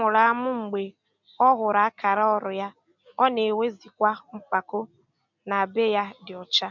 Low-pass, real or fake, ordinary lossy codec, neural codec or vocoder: 7.2 kHz; real; none; none